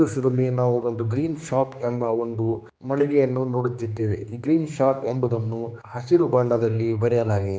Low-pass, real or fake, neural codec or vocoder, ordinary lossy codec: none; fake; codec, 16 kHz, 2 kbps, X-Codec, HuBERT features, trained on general audio; none